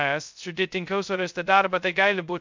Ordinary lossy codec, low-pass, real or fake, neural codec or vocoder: MP3, 64 kbps; 7.2 kHz; fake; codec, 16 kHz, 0.2 kbps, FocalCodec